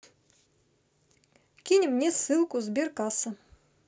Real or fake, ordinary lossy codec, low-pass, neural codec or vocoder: real; none; none; none